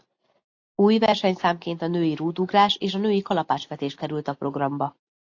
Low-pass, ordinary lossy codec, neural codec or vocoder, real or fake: 7.2 kHz; AAC, 48 kbps; none; real